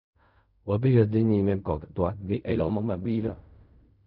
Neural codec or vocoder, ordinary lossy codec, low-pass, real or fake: codec, 16 kHz in and 24 kHz out, 0.4 kbps, LongCat-Audio-Codec, fine tuned four codebook decoder; none; 5.4 kHz; fake